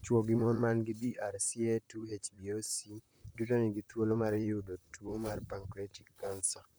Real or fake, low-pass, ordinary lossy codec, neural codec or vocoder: fake; none; none; vocoder, 44.1 kHz, 128 mel bands, Pupu-Vocoder